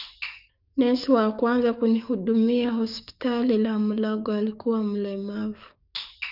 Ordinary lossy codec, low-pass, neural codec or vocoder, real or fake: none; 5.4 kHz; codec, 44.1 kHz, 7.8 kbps, DAC; fake